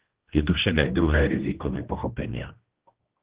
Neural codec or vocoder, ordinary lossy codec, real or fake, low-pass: codec, 16 kHz, 1 kbps, X-Codec, HuBERT features, trained on general audio; Opus, 16 kbps; fake; 3.6 kHz